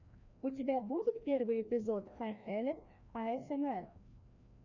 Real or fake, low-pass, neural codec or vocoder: fake; 7.2 kHz; codec, 16 kHz, 1 kbps, FreqCodec, larger model